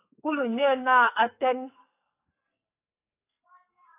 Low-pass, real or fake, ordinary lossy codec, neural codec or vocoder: 3.6 kHz; fake; AAC, 24 kbps; codec, 44.1 kHz, 2.6 kbps, SNAC